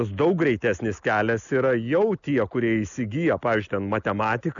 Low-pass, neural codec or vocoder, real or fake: 7.2 kHz; none; real